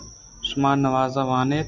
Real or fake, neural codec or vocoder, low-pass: real; none; 7.2 kHz